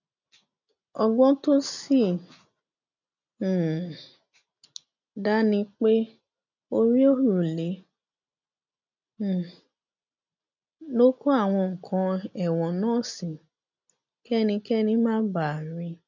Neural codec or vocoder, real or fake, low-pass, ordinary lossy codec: none; real; 7.2 kHz; none